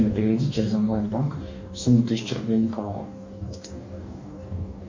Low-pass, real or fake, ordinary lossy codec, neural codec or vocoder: 7.2 kHz; fake; MP3, 64 kbps; codec, 44.1 kHz, 2.6 kbps, DAC